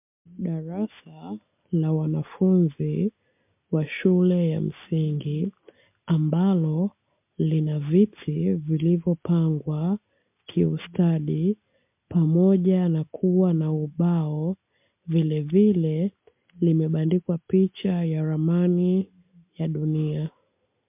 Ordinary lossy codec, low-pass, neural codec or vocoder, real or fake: MP3, 32 kbps; 3.6 kHz; none; real